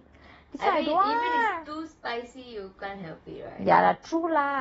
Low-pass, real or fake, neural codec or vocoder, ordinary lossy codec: 19.8 kHz; real; none; AAC, 24 kbps